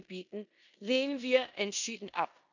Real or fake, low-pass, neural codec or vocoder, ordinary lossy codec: fake; 7.2 kHz; codec, 16 kHz in and 24 kHz out, 0.9 kbps, LongCat-Audio-Codec, four codebook decoder; none